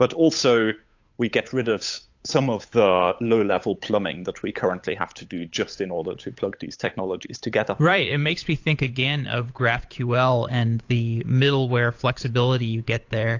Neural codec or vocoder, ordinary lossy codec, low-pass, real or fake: codec, 16 kHz, 8 kbps, FunCodec, trained on Chinese and English, 25 frames a second; AAC, 48 kbps; 7.2 kHz; fake